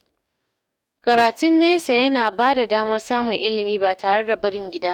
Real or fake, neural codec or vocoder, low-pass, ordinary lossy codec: fake; codec, 44.1 kHz, 2.6 kbps, DAC; 19.8 kHz; none